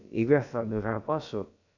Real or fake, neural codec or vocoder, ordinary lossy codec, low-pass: fake; codec, 16 kHz, about 1 kbps, DyCAST, with the encoder's durations; none; 7.2 kHz